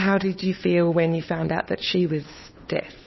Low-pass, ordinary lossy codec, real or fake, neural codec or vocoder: 7.2 kHz; MP3, 24 kbps; fake; codec, 16 kHz, 2 kbps, FunCodec, trained on Chinese and English, 25 frames a second